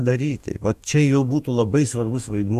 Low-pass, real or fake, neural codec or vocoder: 14.4 kHz; fake; codec, 44.1 kHz, 2.6 kbps, DAC